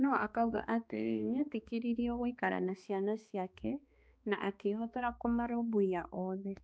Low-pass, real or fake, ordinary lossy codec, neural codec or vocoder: none; fake; none; codec, 16 kHz, 2 kbps, X-Codec, HuBERT features, trained on balanced general audio